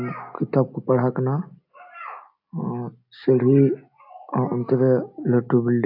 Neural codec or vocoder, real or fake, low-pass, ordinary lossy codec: none; real; 5.4 kHz; AAC, 48 kbps